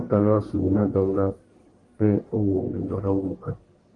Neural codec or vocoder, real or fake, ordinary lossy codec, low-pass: codec, 44.1 kHz, 1.7 kbps, Pupu-Codec; fake; Opus, 24 kbps; 10.8 kHz